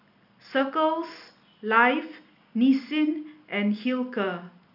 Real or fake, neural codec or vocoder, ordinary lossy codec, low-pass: real; none; none; 5.4 kHz